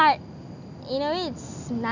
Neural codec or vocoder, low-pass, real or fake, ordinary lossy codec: none; 7.2 kHz; real; none